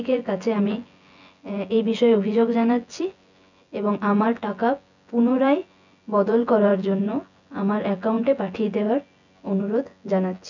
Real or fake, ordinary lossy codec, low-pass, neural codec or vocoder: fake; none; 7.2 kHz; vocoder, 24 kHz, 100 mel bands, Vocos